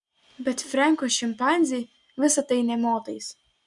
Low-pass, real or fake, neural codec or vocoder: 10.8 kHz; real; none